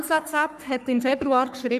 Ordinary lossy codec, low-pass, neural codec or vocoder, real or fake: none; 14.4 kHz; codec, 44.1 kHz, 3.4 kbps, Pupu-Codec; fake